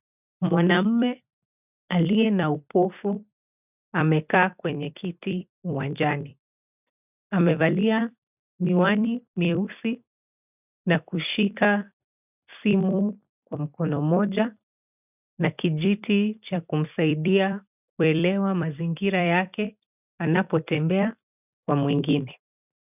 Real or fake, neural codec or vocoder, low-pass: fake; vocoder, 44.1 kHz, 128 mel bands every 256 samples, BigVGAN v2; 3.6 kHz